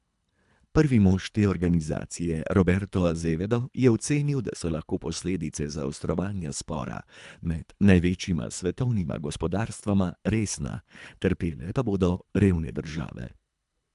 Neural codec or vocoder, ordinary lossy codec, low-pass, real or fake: codec, 24 kHz, 3 kbps, HILCodec; MP3, 96 kbps; 10.8 kHz; fake